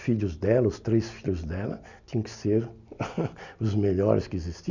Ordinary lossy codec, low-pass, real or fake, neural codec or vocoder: none; 7.2 kHz; real; none